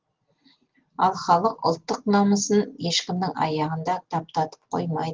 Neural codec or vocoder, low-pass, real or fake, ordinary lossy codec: none; 7.2 kHz; real; Opus, 16 kbps